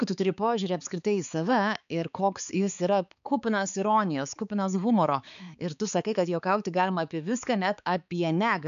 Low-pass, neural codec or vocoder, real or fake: 7.2 kHz; codec, 16 kHz, 4 kbps, X-Codec, HuBERT features, trained on balanced general audio; fake